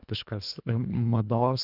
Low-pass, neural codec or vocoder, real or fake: 5.4 kHz; codec, 24 kHz, 1.5 kbps, HILCodec; fake